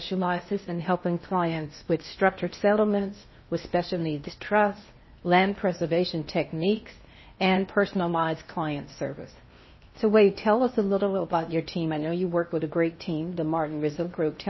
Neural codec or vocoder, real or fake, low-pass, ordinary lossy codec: codec, 16 kHz in and 24 kHz out, 0.8 kbps, FocalCodec, streaming, 65536 codes; fake; 7.2 kHz; MP3, 24 kbps